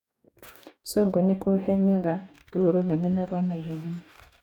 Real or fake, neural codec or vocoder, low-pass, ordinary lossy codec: fake; codec, 44.1 kHz, 2.6 kbps, DAC; 19.8 kHz; none